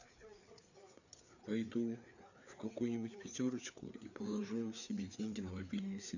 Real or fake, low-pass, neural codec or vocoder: fake; 7.2 kHz; codec, 16 kHz, 4 kbps, FreqCodec, larger model